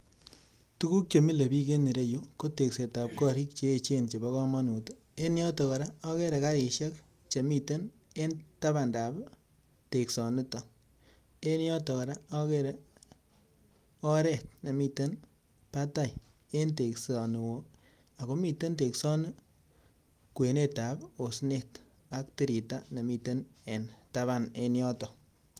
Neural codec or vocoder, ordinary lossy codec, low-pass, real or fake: none; Opus, 32 kbps; 14.4 kHz; real